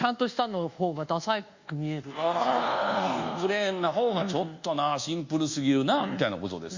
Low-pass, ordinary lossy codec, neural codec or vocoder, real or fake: 7.2 kHz; Opus, 64 kbps; codec, 24 kHz, 1.2 kbps, DualCodec; fake